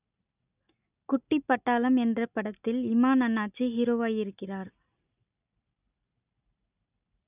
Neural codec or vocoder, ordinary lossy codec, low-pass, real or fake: none; none; 3.6 kHz; real